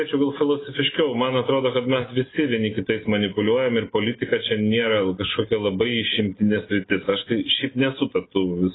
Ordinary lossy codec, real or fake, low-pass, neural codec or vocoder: AAC, 16 kbps; real; 7.2 kHz; none